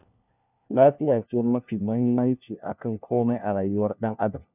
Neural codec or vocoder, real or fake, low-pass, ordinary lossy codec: codec, 16 kHz, 1 kbps, FunCodec, trained on LibriTTS, 50 frames a second; fake; 3.6 kHz; none